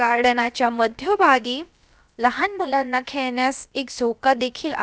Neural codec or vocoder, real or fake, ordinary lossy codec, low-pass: codec, 16 kHz, about 1 kbps, DyCAST, with the encoder's durations; fake; none; none